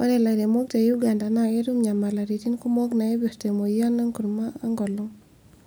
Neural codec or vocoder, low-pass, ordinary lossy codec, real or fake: none; none; none; real